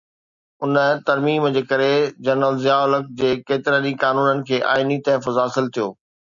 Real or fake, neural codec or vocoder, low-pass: real; none; 7.2 kHz